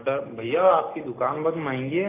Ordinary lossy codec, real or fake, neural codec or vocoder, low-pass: AAC, 16 kbps; real; none; 3.6 kHz